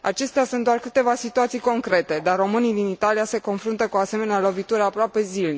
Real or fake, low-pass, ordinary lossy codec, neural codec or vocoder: real; none; none; none